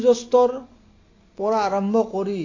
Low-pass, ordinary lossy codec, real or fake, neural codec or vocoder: 7.2 kHz; AAC, 32 kbps; real; none